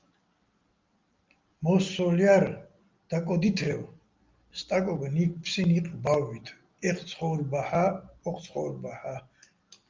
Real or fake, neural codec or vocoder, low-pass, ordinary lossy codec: real; none; 7.2 kHz; Opus, 24 kbps